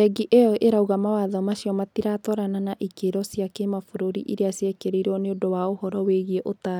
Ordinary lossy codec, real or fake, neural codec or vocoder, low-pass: none; real; none; 19.8 kHz